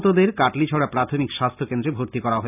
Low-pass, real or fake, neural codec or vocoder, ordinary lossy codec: 3.6 kHz; real; none; none